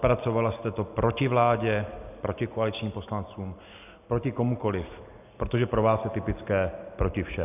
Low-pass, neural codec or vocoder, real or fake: 3.6 kHz; none; real